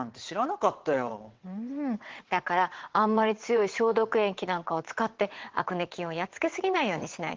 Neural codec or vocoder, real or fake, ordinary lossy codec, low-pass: vocoder, 22.05 kHz, 80 mel bands, WaveNeXt; fake; Opus, 16 kbps; 7.2 kHz